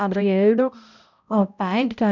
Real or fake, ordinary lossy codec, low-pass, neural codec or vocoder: fake; none; 7.2 kHz; codec, 16 kHz, 0.5 kbps, X-Codec, HuBERT features, trained on balanced general audio